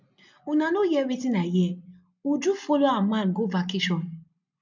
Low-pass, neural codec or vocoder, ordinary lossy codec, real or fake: 7.2 kHz; none; none; real